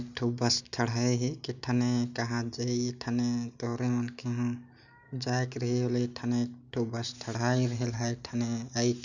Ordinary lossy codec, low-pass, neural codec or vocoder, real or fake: none; 7.2 kHz; none; real